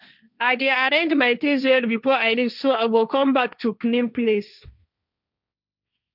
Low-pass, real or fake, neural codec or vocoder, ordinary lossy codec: 5.4 kHz; fake; codec, 16 kHz, 1.1 kbps, Voila-Tokenizer; none